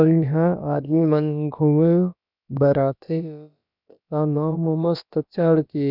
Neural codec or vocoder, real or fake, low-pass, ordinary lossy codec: codec, 16 kHz, about 1 kbps, DyCAST, with the encoder's durations; fake; 5.4 kHz; none